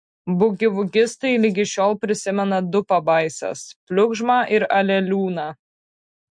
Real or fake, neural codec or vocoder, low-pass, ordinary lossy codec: real; none; 9.9 kHz; MP3, 64 kbps